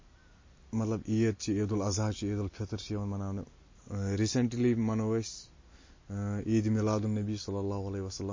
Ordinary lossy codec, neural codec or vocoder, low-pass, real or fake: MP3, 32 kbps; none; 7.2 kHz; real